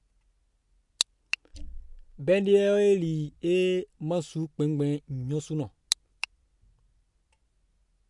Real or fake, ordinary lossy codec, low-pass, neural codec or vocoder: real; MP3, 64 kbps; 10.8 kHz; none